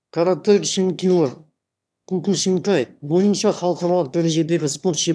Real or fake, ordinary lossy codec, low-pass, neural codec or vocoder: fake; none; none; autoencoder, 22.05 kHz, a latent of 192 numbers a frame, VITS, trained on one speaker